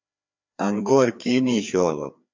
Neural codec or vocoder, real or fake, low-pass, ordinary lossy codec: codec, 16 kHz, 2 kbps, FreqCodec, larger model; fake; 7.2 kHz; MP3, 48 kbps